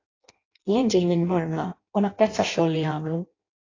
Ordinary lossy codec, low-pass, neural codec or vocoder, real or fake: AAC, 32 kbps; 7.2 kHz; codec, 16 kHz in and 24 kHz out, 0.6 kbps, FireRedTTS-2 codec; fake